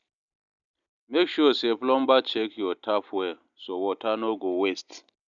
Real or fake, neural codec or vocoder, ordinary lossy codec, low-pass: real; none; none; 7.2 kHz